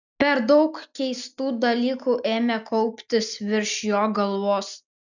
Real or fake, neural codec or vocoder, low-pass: real; none; 7.2 kHz